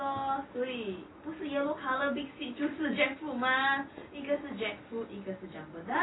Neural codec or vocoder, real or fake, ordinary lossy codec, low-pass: none; real; AAC, 16 kbps; 7.2 kHz